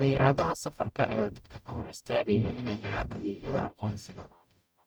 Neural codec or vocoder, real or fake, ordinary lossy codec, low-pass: codec, 44.1 kHz, 0.9 kbps, DAC; fake; none; none